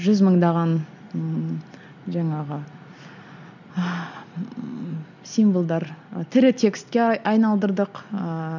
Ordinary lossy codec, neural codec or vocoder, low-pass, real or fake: none; none; 7.2 kHz; real